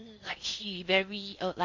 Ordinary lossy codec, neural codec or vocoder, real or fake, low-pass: MP3, 48 kbps; codec, 16 kHz in and 24 kHz out, 0.6 kbps, FocalCodec, streaming, 4096 codes; fake; 7.2 kHz